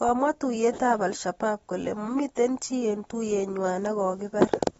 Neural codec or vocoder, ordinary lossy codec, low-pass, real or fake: none; AAC, 24 kbps; 19.8 kHz; real